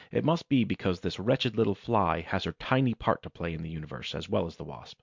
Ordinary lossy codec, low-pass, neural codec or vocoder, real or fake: MP3, 64 kbps; 7.2 kHz; none; real